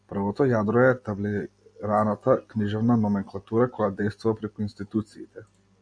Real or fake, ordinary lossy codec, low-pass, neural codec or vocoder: real; AAC, 64 kbps; 9.9 kHz; none